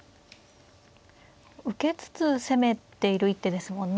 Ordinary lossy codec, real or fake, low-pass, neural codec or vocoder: none; real; none; none